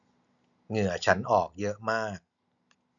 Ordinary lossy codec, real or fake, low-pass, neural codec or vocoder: none; real; 7.2 kHz; none